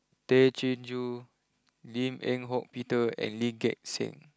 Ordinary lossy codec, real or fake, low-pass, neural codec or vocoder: none; real; none; none